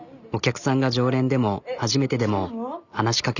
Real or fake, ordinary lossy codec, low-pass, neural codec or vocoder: real; none; 7.2 kHz; none